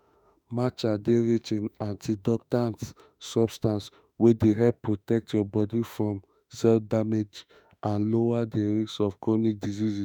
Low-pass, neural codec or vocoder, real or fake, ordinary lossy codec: none; autoencoder, 48 kHz, 32 numbers a frame, DAC-VAE, trained on Japanese speech; fake; none